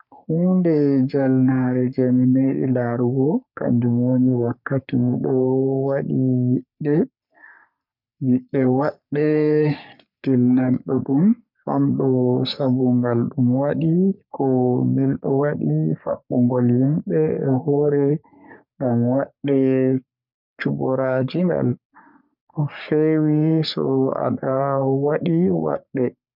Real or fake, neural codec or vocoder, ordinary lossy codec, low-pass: fake; codec, 44.1 kHz, 3.4 kbps, Pupu-Codec; none; 5.4 kHz